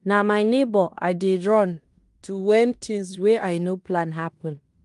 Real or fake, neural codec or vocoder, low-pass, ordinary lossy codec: fake; codec, 16 kHz in and 24 kHz out, 0.9 kbps, LongCat-Audio-Codec, fine tuned four codebook decoder; 10.8 kHz; Opus, 32 kbps